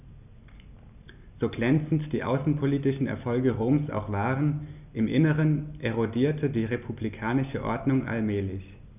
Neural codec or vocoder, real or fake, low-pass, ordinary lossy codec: none; real; 3.6 kHz; none